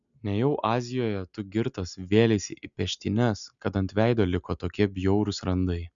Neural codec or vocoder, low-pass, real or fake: none; 7.2 kHz; real